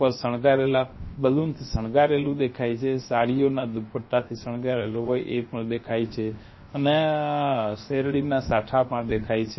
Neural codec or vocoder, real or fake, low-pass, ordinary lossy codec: codec, 16 kHz, about 1 kbps, DyCAST, with the encoder's durations; fake; 7.2 kHz; MP3, 24 kbps